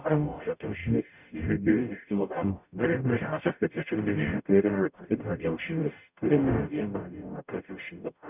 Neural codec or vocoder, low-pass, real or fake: codec, 44.1 kHz, 0.9 kbps, DAC; 3.6 kHz; fake